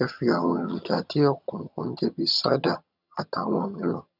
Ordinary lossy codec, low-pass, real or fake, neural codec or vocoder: none; 5.4 kHz; fake; vocoder, 22.05 kHz, 80 mel bands, HiFi-GAN